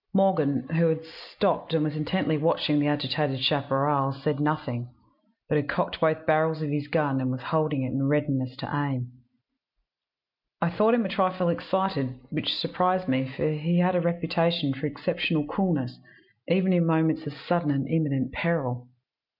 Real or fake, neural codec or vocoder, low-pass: real; none; 5.4 kHz